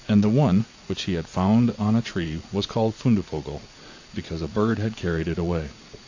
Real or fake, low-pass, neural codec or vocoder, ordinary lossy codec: fake; 7.2 kHz; vocoder, 44.1 kHz, 80 mel bands, Vocos; AAC, 48 kbps